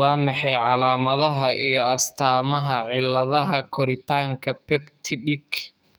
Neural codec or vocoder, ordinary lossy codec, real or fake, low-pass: codec, 44.1 kHz, 2.6 kbps, SNAC; none; fake; none